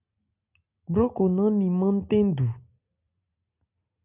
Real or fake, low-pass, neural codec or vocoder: real; 3.6 kHz; none